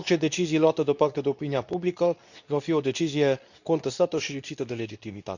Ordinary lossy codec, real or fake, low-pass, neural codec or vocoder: none; fake; 7.2 kHz; codec, 24 kHz, 0.9 kbps, WavTokenizer, medium speech release version 2